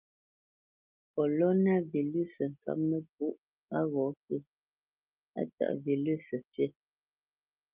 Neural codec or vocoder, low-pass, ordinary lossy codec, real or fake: none; 3.6 kHz; Opus, 24 kbps; real